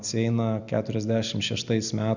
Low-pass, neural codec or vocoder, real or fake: 7.2 kHz; none; real